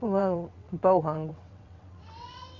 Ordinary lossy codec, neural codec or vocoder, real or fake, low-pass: AAC, 48 kbps; vocoder, 22.05 kHz, 80 mel bands, WaveNeXt; fake; 7.2 kHz